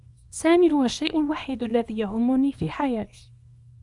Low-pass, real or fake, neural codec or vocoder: 10.8 kHz; fake; codec, 24 kHz, 0.9 kbps, WavTokenizer, small release